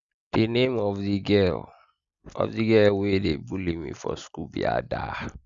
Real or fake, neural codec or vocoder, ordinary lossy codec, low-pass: real; none; Opus, 64 kbps; 7.2 kHz